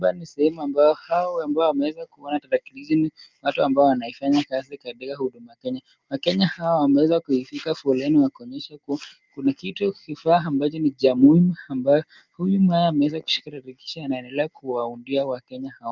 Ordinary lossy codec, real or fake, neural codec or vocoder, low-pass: Opus, 24 kbps; real; none; 7.2 kHz